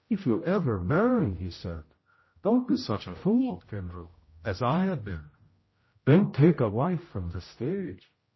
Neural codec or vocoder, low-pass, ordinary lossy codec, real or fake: codec, 16 kHz, 0.5 kbps, X-Codec, HuBERT features, trained on general audio; 7.2 kHz; MP3, 24 kbps; fake